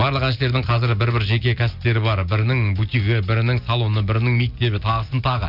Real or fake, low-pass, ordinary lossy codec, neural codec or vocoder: real; 5.4 kHz; none; none